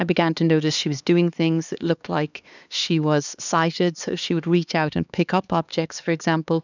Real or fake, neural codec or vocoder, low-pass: fake; codec, 16 kHz, 2 kbps, X-Codec, HuBERT features, trained on LibriSpeech; 7.2 kHz